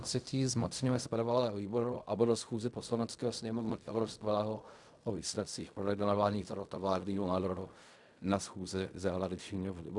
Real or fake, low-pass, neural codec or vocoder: fake; 10.8 kHz; codec, 16 kHz in and 24 kHz out, 0.4 kbps, LongCat-Audio-Codec, fine tuned four codebook decoder